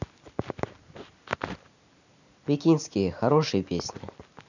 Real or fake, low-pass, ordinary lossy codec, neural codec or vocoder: real; 7.2 kHz; none; none